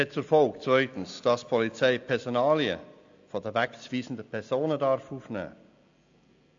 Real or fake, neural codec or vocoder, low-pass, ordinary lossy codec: real; none; 7.2 kHz; AAC, 64 kbps